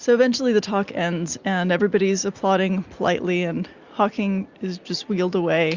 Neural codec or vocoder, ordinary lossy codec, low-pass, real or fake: none; Opus, 64 kbps; 7.2 kHz; real